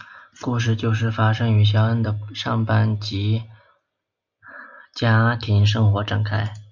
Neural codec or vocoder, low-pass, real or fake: none; 7.2 kHz; real